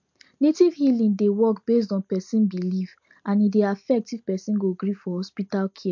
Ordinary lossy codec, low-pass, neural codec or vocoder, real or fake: MP3, 48 kbps; 7.2 kHz; none; real